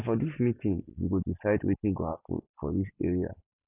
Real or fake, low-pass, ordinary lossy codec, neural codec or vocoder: real; 3.6 kHz; none; none